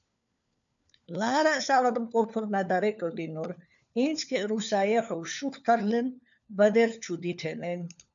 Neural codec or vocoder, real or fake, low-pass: codec, 16 kHz, 4 kbps, FunCodec, trained on LibriTTS, 50 frames a second; fake; 7.2 kHz